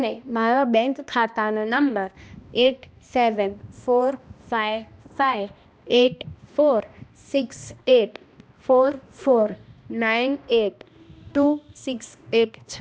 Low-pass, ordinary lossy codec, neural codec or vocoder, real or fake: none; none; codec, 16 kHz, 1 kbps, X-Codec, HuBERT features, trained on balanced general audio; fake